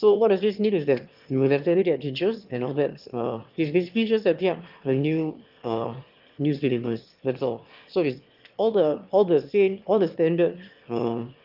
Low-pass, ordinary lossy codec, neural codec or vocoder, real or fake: 5.4 kHz; Opus, 24 kbps; autoencoder, 22.05 kHz, a latent of 192 numbers a frame, VITS, trained on one speaker; fake